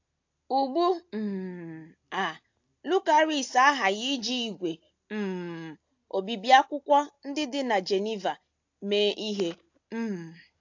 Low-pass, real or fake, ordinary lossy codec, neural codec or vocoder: 7.2 kHz; real; AAC, 48 kbps; none